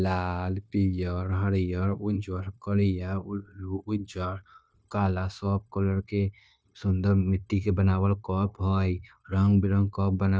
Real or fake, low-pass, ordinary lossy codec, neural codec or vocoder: fake; none; none; codec, 16 kHz, 0.9 kbps, LongCat-Audio-Codec